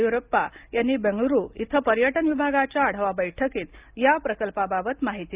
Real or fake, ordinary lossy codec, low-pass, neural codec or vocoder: real; Opus, 24 kbps; 3.6 kHz; none